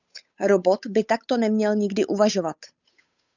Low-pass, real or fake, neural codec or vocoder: 7.2 kHz; fake; codec, 16 kHz, 8 kbps, FunCodec, trained on Chinese and English, 25 frames a second